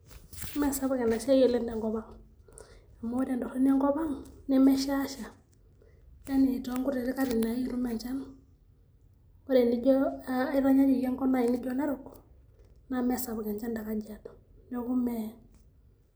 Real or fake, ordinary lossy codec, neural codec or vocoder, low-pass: real; none; none; none